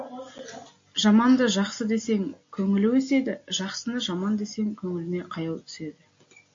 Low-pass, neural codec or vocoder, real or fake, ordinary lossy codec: 7.2 kHz; none; real; AAC, 48 kbps